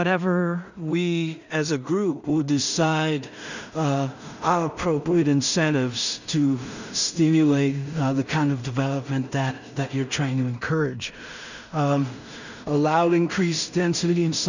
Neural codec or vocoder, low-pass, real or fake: codec, 16 kHz in and 24 kHz out, 0.4 kbps, LongCat-Audio-Codec, two codebook decoder; 7.2 kHz; fake